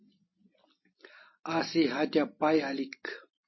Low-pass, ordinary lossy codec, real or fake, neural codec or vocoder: 7.2 kHz; MP3, 24 kbps; real; none